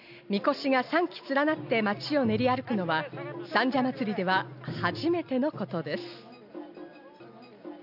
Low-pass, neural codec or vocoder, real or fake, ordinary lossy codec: 5.4 kHz; none; real; none